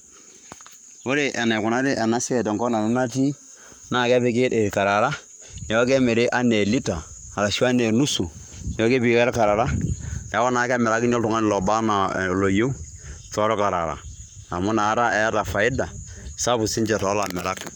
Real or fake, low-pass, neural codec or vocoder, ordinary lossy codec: fake; 19.8 kHz; codec, 44.1 kHz, 7.8 kbps, Pupu-Codec; none